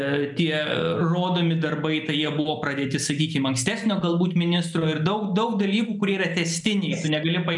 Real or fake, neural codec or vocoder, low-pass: real; none; 10.8 kHz